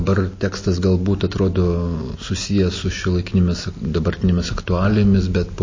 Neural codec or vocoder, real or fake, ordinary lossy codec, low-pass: none; real; MP3, 32 kbps; 7.2 kHz